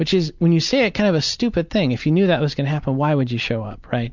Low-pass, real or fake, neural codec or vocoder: 7.2 kHz; real; none